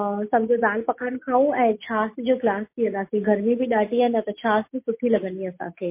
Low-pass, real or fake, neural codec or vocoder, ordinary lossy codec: 3.6 kHz; real; none; MP3, 24 kbps